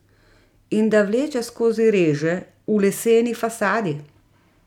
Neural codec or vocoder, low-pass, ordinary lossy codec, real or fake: none; 19.8 kHz; none; real